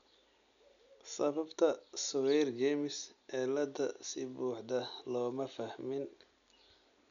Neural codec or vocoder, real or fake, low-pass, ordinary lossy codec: none; real; 7.2 kHz; none